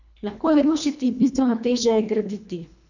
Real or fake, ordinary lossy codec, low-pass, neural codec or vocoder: fake; none; 7.2 kHz; codec, 24 kHz, 1.5 kbps, HILCodec